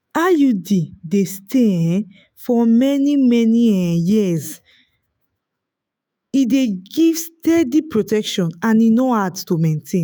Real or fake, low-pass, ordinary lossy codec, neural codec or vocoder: fake; none; none; autoencoder, 48 kHz, 128 numbers a frame, DAC-VAE, trained on Japanese speech